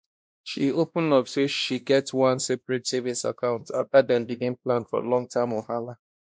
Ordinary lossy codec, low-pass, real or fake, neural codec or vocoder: none; none; fake; codec, 16 kHz, 1 kbps, X-Codec, WavLM features, trained on Multilingual LibriSpeech